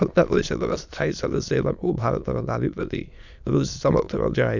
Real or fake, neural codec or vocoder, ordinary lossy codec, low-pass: fake; autoencoder, 22.05 kHz, a latent of 192 numbers a frame, VITS, trained on many speakers; none; 7.2 kHz